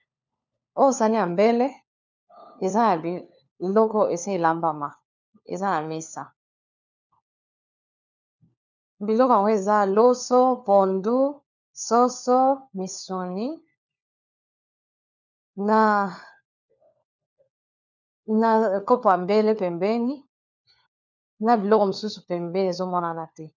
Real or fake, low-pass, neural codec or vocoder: fake; 7.2 kHz; codec, 16 kHz, 4 kbps, FunCodec, trained on LibriTTS, 50 frames a second